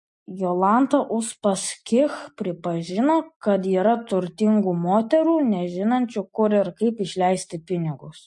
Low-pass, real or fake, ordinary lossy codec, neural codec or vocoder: 10.8 kHz; real; MP3, 48 kbps; none